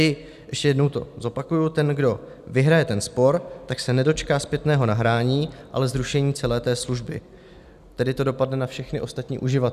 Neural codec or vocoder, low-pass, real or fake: none; 14.4 kHz; real